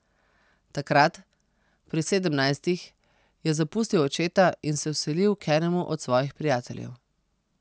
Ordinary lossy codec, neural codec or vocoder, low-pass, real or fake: none; none; none; real